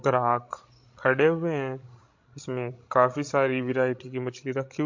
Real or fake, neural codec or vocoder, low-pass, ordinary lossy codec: fake; codec, 16 kHz, 16 kbps, FreqCodec, larger model; 7.2 kHz; MP3, 48 kbps